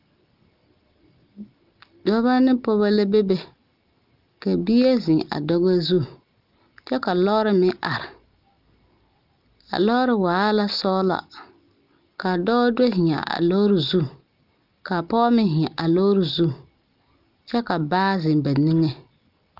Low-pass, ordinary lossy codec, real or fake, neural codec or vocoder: 5.4 kHz; Opus, 32 kbps; real; none